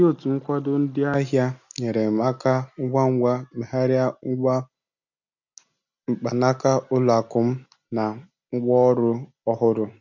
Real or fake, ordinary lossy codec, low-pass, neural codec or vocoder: real; none; 7.2 kHz; none